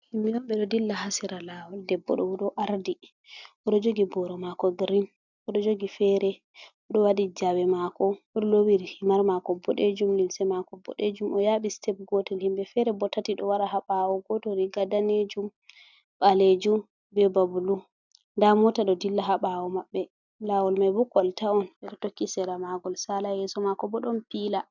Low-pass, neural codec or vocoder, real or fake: 7.2 kHz; none; real